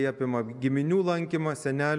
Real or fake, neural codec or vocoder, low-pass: real; none; 10.8 kHz